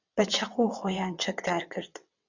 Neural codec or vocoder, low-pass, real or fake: vocoder, 22.05 kHz, 80 mel bands, WaveNeXt; 7.2 kHz; fake